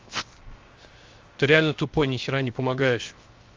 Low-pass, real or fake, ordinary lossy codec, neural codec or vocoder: 7.2 kHz; fake; Opus, 32 kbps; codec, 16 kHz, 0.7 kbps, FocalCodec